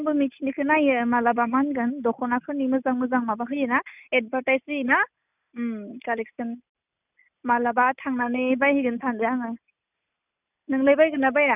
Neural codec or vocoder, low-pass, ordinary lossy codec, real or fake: none; 3.6 kHz; none; real